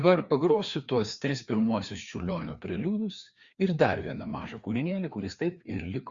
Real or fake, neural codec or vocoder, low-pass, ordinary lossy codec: fake; codec, 16 kHz, 2 kbps, FreqCodec, larger model; 7.2 kHz; Opus, 64 kbps